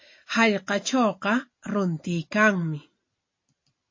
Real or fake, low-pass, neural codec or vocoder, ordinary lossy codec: real; 7.2 kHz; none; MP3, 32 kbps